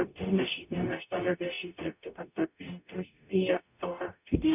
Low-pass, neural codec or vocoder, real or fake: 3.6 kHz; codec, 44.1 kHz, 0.9 kbps, DAC; fake